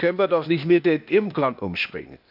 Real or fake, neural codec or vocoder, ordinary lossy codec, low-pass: fake; codec, 16 kHz, 0.8 kbps, ZipCodec; none; 5.4 kHz